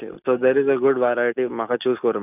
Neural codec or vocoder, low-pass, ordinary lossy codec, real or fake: none; 3.6 kHz; none; real